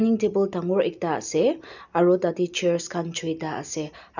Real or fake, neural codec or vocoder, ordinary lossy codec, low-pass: real; none; none; 7.2 kHz